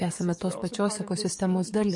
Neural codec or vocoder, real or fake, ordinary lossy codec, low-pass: codec, 44.1 kHz, 7.8 kbps, DAC; fake; MP3, 48 kbps; 10.8 kHz